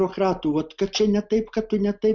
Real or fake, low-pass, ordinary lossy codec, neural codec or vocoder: real; 7.2 kHz; Opus, 64 kbps; none